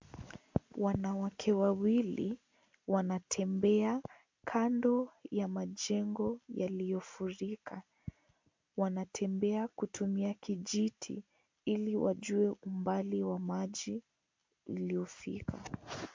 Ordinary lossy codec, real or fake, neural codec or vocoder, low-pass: MP3, 64 kbps; real; none; 7.2 kHz